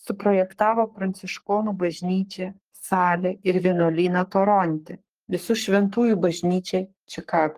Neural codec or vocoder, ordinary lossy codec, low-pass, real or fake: codec, 44.1 kHz, 3.4 kbps, Pupu-Codec; Opus, 24 kbps; 14.4 kHz; fake